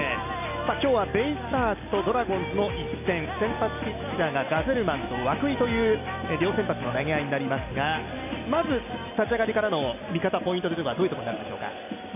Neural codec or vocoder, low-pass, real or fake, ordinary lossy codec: none; 3.6 kHz; real; none